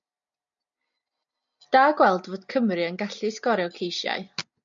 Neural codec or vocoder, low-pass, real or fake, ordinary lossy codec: none; 7.2 kHz; real; MP3, 48 kbps